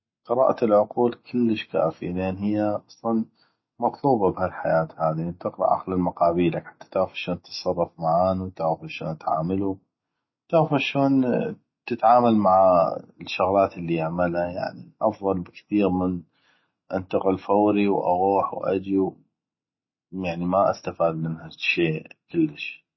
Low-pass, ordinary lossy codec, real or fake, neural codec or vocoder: 7.2 kHz; MP3, 24 kbps; real; none